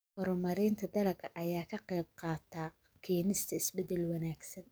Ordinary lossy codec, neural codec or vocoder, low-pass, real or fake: none; codec, 44.1 kHz, 7.8 kbps, DAC; none; fake